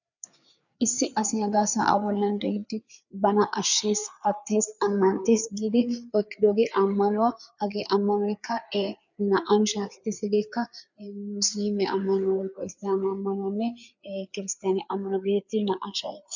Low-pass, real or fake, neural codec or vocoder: 7.2 kHz; fake; codec, 16 kHz, 4 kbps, FreqCodec, larger model